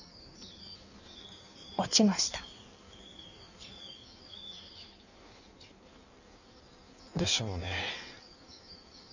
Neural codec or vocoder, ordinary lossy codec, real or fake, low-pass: codec, 16 kHz in and 24 kHz out, 1.1 kbps, FireRedTTS-2 codec; none; fake; 7.2 kHz